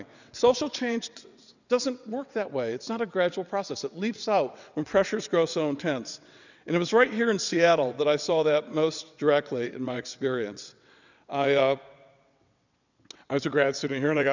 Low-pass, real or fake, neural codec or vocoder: 7.2 kHz; fake; vocoder, 22.05 kHz, 80 mel bands, WaveNeXt